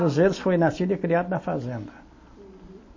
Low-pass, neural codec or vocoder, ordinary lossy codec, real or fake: 7.2 kHz; none; MP3, 32 kbps; real